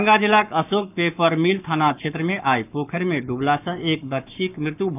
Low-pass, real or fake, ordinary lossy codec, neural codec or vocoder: 3.6 kHz; fake; none; codec, 44.1 kHz, 7.8 kbps, Pupu-Codec